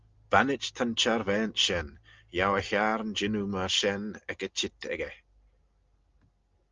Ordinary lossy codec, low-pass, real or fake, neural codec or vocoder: Opus, 32 kbps; 7.2 kHz; real; none